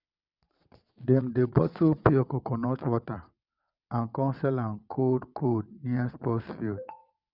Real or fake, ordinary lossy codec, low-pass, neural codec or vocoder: fake; none; 5.4 kHz; vocoder, 22.05 kHz, 80 mel bands, WaveNeXt